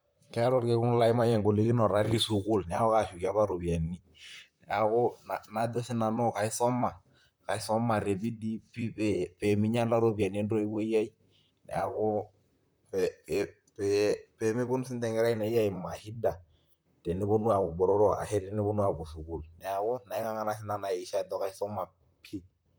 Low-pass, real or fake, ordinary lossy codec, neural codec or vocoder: none; fake; none; vocoder, 44.1 kHz, 128 mel bands, Pupu-Vocoder